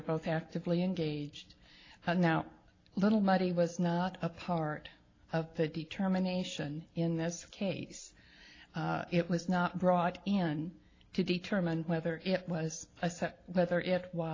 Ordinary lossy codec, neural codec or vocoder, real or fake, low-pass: AAC, 32 kbps; none; real; 7.2 kHz